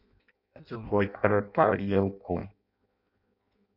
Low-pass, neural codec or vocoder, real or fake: 5.4 kHz; codec, 16 kHz in and 24 kHz out, 0.6 kbps, FireRedTTS-2 codec; fake